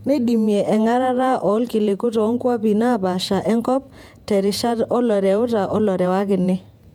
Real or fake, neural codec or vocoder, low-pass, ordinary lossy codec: fake; vocoder, 48 kHz, 128 mel bands, Vocos; 19.8 kHz; MP3, 96 kbps